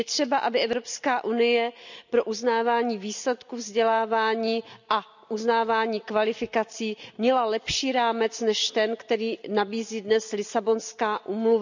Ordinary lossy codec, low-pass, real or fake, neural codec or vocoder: none; 7.2 kHz; real; none